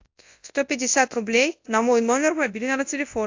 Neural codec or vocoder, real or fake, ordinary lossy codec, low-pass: codec, 24 kHz, 0.9 kbps, WavTokenizer, large speech release; fake; AAC, 48 kbps; 7.2 kHz